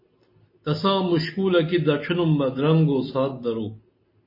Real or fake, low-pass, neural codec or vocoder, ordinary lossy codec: real; 5.4 kHz; none; MP3, 24 kbps